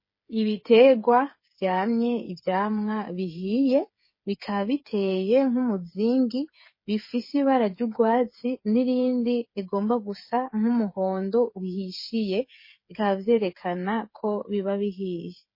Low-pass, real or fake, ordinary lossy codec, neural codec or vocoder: 5.4 kHz; fake; MP3, 24 kbps; codec, 16 kHz, 8 kbps, FreqCodec, smaller model